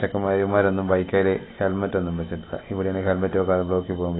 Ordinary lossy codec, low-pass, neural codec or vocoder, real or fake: AAC, 16 kbps; 7.2 kHz; none; real